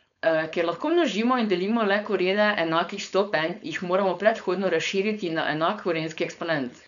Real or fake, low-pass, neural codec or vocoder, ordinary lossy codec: fake; 7.2 kHz; codec, 16 kHz, 4.8 kbps, FACodec; none